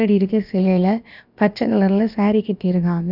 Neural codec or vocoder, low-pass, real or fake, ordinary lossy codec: codec, 16 kHz, about 1 kbps, DyCAST, with the encoder's durations; 5.4 kHz; fake; none